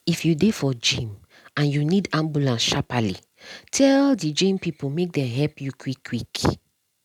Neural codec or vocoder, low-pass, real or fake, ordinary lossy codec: none; 19.8 kHz; real; none